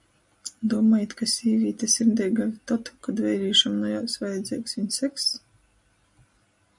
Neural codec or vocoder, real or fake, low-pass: none; real; 10.8 kHz